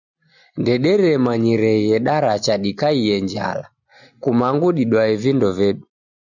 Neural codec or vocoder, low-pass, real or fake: none; 7.2 kHz; real